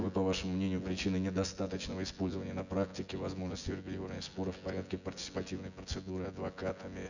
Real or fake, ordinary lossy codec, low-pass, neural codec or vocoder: fake; none; 7.2 kHz; vocoder, 24 kHz, 100 mel bands, Vocos